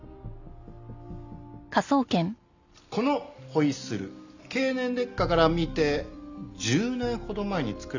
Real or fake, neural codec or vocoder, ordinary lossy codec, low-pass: real; none; none; 7.2 kHz